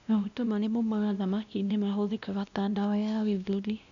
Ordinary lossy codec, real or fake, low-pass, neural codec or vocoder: none; fake; 7.2 kHz; codec, 16 kHz, 1 kbps, X-Codec, WavLM features, trained on Multilingual LibriSpeech